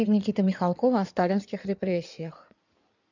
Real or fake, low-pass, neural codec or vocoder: fake; 7.2 kHz; codec, 16 kHz in and 24 kHz out, 2.2 kbps, FireRedTTS-2 codec